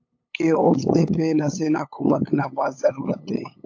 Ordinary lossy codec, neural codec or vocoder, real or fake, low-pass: AAC, 48 kbps; codec, 16 kHz, 8 kbps, FunCodec, trained on LibriTTS, 25 frames a second; fake; 7.2 kHz